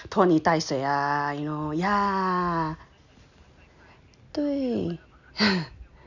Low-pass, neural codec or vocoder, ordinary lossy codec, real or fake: 7.2 kHz; none; none; real